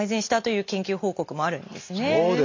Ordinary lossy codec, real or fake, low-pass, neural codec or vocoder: MP3, 48 kbps; real; 7.2 kHz; none